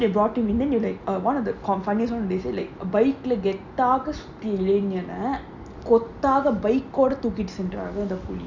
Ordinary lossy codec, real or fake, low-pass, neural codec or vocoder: none; real; 7.2 kHz; none